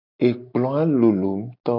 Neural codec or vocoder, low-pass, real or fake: none; 5.4 kHz; real